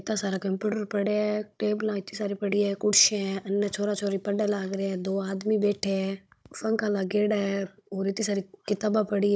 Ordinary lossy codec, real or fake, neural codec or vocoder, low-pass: none; fake; codec, 16 kHz, 16 kbps, FunCodec, trained on Chinese and English, 50 frames a second; none